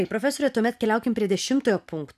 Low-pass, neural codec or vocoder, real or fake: 14.4 kHz; none; real